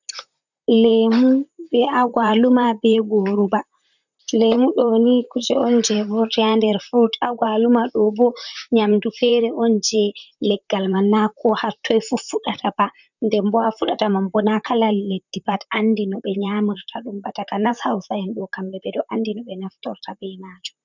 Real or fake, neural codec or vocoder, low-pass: fake; vocoder, 44.1 kHz, 128 mel bands, Pupu-Vocoder; 7.2 kHz